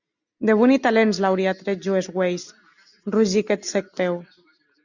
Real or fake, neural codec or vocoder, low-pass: real; none; 7.2 kHz